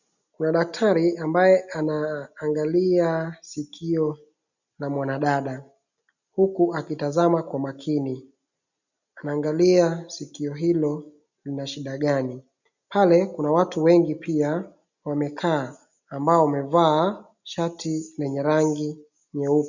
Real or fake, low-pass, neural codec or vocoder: real; 7.2 kHz; none